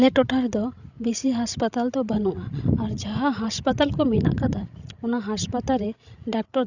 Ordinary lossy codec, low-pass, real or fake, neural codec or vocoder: none; 7.2 kHz; fake; codec, 16 kHz, 16 kbps, FreqCodec, larger model